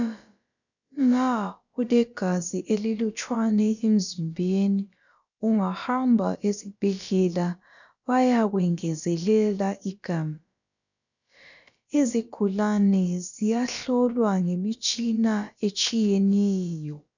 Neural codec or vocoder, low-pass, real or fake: codec, 16 kHz, about 1 kbps, DyCAST, with the encoder's durations; 7.2 kHz; fake